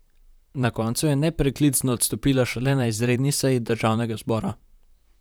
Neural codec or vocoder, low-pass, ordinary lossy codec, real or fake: vocoder, 44.1 kHz, 128 mel bands, Pupu-Vocoder; none; none; fake